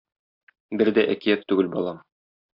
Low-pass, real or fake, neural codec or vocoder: 5.4 kHz; real; none